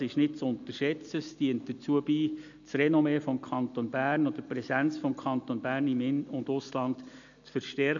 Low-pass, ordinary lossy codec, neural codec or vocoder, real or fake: 7.2 kHz; none; none; real